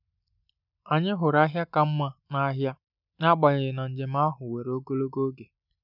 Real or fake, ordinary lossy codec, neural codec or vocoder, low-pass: real; none; none; 5.4 kHz